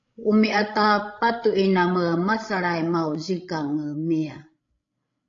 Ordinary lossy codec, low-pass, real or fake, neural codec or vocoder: AAC, 48 kbps; 7.2 kHz; fake; codec, 16 kHz, 16 kbps, FreqCodec, larger model